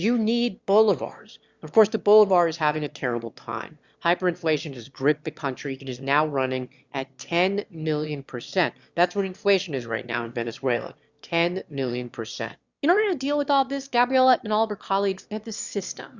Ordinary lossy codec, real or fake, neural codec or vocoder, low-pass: Opus, 64 kbps; fake; autoencoder, 22.05 kHz, a latent of 192 numbers a frame, VITS, trained on one speaker; 7.2 kHz